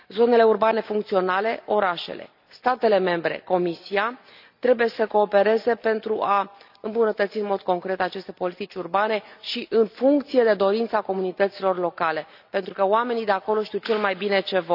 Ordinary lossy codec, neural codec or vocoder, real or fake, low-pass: none; none; real; 5.4 kHz